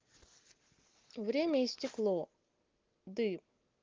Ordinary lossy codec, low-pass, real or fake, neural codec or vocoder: Opus, 32 kbps; 7.2 kHz; real; none